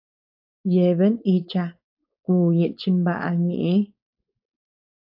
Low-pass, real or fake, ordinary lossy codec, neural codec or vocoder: 5.4 kHz; fake; AAC, 48 kbps; codec, 16 kHz, 4.8 kbps, FACodec